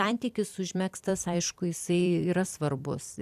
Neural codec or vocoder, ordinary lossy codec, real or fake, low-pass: vocoder, 44.1 kHz, 128 mel bands every 256 samples, BigVGAN v2; AAC, 96 kbps; fake; 14.4 kHz